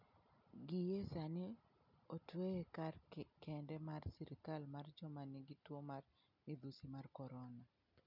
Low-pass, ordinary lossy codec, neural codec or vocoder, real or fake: 5.4 kHz; none; none; real